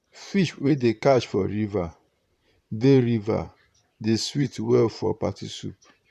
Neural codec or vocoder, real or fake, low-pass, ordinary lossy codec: vocoder, 44.1 kHz, 128 mel bands, Pupu-Vocoder; fake; 14.4 kHz; none